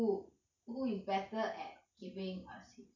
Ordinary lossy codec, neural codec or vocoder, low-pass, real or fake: none; none; 7.2 kHz; real